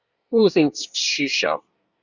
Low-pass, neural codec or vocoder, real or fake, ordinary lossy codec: 7.2 kHz; codec, 24 kHz, 1 kbps, SNAC; fake; Opus, 64 kbps